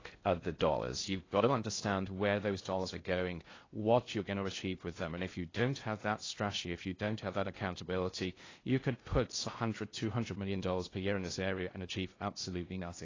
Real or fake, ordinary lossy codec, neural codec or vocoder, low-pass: fake; AAC, 32 kbps; codec, 16 kHz in and 24 kHz out, 0.6 kbps, FocalCodec, streaming, 4096 codes; 7.2 kHz